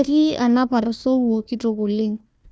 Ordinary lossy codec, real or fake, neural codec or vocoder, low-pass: none; fake; codec, 16 kHz, 1 kbps, FunCodec, trained on Chinese and English, 50 frames a second; none